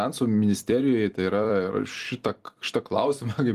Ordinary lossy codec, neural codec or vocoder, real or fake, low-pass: Opus, 24 kbps; vocoder, 44.1 kHz, 128 mel bands every 256 samples, BigVGAN v2; fake; 14.4 kHz